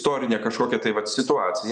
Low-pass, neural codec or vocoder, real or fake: 9.9 kHz; none; real